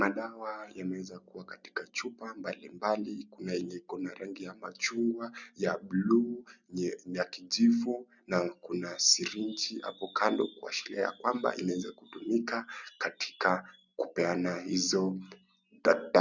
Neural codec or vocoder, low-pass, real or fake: none; 7.2 kHz; real